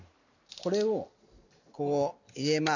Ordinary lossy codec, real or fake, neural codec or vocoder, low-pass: none; real; none; 7.2 kHz